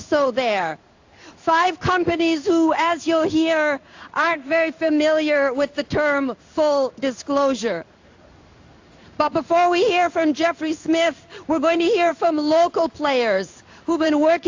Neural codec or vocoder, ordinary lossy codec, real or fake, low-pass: codec, 16 kHz in and 24 kHz out, 1 kbps, XY-Tokenizer; AAC, 48 kbps; fake; 7.2 kHz